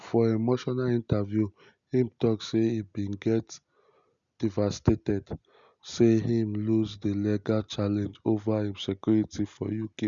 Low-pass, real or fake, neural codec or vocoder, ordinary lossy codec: 7.2 kHz; real; none; none